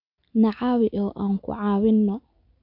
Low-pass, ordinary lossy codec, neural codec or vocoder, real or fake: 5.4 kHz; none; none; real